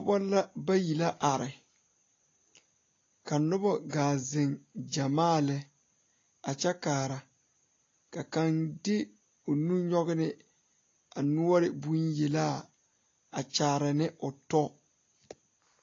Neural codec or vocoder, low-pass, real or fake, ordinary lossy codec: none; 7.2 kHz; real; AAC, 32 kbps